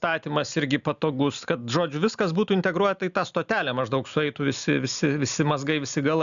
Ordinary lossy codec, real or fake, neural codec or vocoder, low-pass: MP3, 96 kbps; real; none; 7.2 kHz